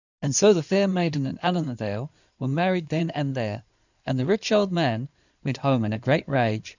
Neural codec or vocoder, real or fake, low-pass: codec, 16 kHz in and 24 kHz out, 2.2 kbps, FireRedTTS-2 codec; fake; 7.2 kHz